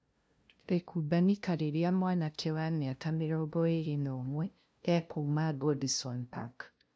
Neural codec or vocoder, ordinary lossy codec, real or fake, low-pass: codec, 16 kHz, 0.5 kbps, FunCodec, trained on LibriTTS, 25 frames a second; none; fake; none